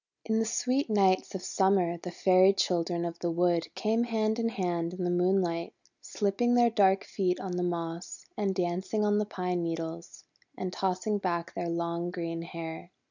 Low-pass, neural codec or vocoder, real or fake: 7.2 kHz; none; real